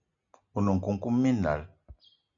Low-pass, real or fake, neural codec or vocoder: 7.2 kHz; real; none